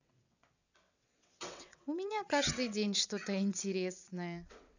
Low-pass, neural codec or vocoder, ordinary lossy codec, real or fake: 7.2 kHz; vocoder, 22.05 kHz, 80 mel bands, WaveNeXt; none; fake